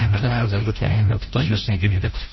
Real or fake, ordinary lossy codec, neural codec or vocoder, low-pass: fake; MP3, 24 kbps; codec, 16 kHz, 0.5 kbps, FreqCodec, larger model; 7.2 kHz